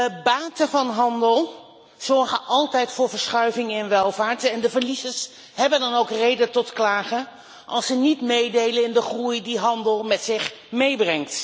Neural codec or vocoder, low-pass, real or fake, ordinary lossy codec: none; none; real; none